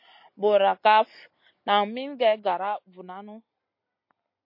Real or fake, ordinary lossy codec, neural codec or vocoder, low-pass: real; MP3, 32 kbps; none; 5.4 kHz